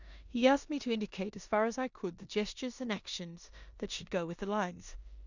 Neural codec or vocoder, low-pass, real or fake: codec, 16 kHz in and 24 kHz out, 0.9 kbps, LongCat-Audio-Codec, four codebook decoder; 7.2 kHz; fake